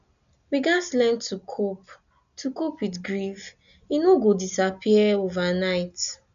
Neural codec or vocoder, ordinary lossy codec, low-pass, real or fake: none; none; 7.2 kHz; real